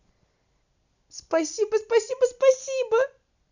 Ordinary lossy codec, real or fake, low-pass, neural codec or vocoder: none; real; 7.2 kHz; none